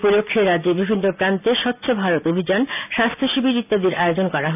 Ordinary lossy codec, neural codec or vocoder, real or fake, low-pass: none; none; real; 3.6 kHz